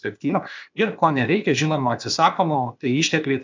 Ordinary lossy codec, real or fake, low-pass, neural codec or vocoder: MP3, 64 kbps; fake; 7.2 kHz; codec, 16 kHz, 0.8 kbps, ZipCodec